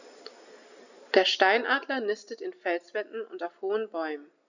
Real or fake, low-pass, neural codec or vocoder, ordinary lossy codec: real; 7.2 kHz; none; none